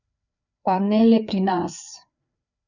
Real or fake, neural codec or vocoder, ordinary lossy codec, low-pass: fake; codec, 16 kHz, 4 kbps, FreqCodec, larger model; none; 7.2 kHz